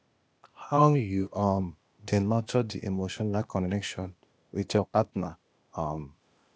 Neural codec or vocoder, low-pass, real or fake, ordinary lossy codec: codec, 16 kHz, 0.8 kbps, ZipCodec; none; fake; none